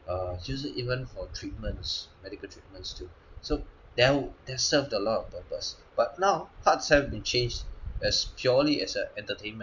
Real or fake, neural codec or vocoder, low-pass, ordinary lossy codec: real; none; 7.2 kHz; none